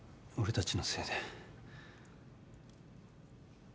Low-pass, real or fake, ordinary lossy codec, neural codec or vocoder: none; real; none; none